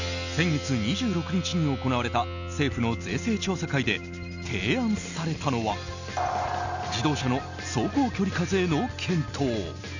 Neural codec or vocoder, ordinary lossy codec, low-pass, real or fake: none; none; 7.2 kHz; real